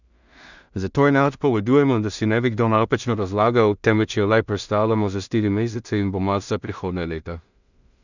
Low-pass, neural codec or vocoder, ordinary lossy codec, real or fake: 7.2 kHz; codec, 16 kHz in and 24 kHz out, 0.4 kbps, LongCat-Audio-Codec, two codebook decoder; none; fake